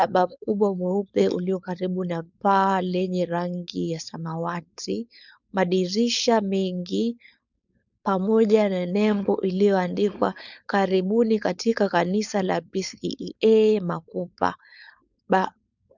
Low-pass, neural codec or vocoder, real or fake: 7.2 kHz; codec, 16 kHz, 4.8 kbps, FACodec; fake